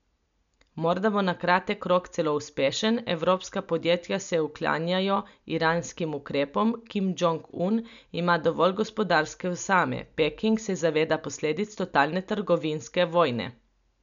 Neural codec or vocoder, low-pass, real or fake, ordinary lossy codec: none; 7.2 kHz; real; none